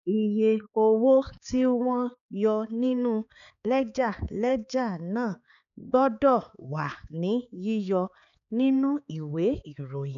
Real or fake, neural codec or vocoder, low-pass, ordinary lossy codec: fake; codec, 16 kHz, 4 kbps, X-Codec, HuBERT features, trained on balanced general audio; 7.2 kHz; none